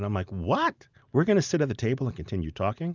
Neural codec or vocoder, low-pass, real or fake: none; 7.2 kHz; real